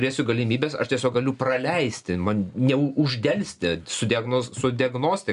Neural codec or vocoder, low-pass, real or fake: none; 10.8 kHz; real